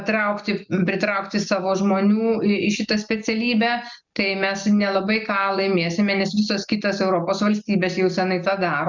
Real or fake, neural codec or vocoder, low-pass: real; none; 7.2 kHz